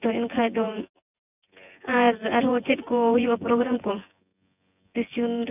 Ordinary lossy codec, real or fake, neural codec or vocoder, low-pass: none; fake; vocoder, 24 kHz, 100 mel bands, Vocos; 3.6 kHz